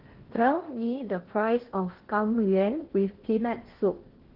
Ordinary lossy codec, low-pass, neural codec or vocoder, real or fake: Opus, 16 kbps; 5.4 kHz; codec, 16 kHz in and 24 kHz out, 0.8 kbps, FocalCodec, streaming, 65536 codes; fake